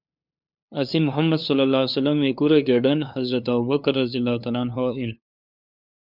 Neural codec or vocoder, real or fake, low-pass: codec, 16 kHz, 2 kbps, FunCodec, trained on LibriTTS, 25 frames a second; fake; 5.4 kHz